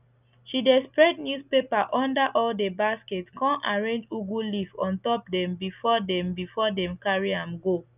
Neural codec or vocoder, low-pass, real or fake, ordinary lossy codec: none; 3.6 kHz; real; none